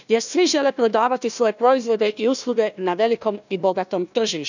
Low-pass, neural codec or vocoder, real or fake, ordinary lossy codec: 7.2 kHz; codec, 16 kHz, 1 kbps, FunCodec, trained on Chinese and English, 50 frames a second; fake; none